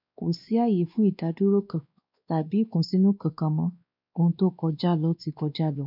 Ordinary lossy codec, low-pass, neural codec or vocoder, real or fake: none; 5.4 kHz; codec, 16 kHz, 2 kbps, X-Codec, WavLM features, trained on Multilingual LibriSpeech; fake